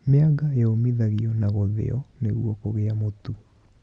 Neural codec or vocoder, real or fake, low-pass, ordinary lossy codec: none; real; 9.9 kHz; Opus, 64 kbps